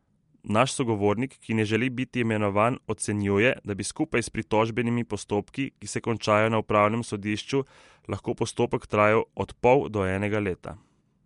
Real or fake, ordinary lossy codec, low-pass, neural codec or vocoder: real; MP3, 64 kbps; 10.8 kHz; none